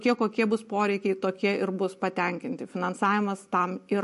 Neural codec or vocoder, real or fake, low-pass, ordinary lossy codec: none; real; 14.4 kHz; MP3, 48 kbps